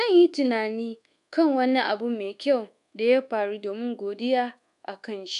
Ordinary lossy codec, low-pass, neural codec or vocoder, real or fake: MP3, 96 kbps; 10.8 kHz; codec, 24 kHz, 1.2 kbps, DualCodec; fake